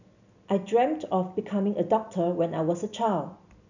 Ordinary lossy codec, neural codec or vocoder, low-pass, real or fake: none; none; 7.2 kHz; real